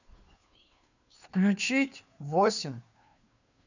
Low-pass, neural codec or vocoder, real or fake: 7.2 kHz; codec, 16 kHz, 2 kbps, FunCodec, trained on Chinese and English, 25 frames a second; fake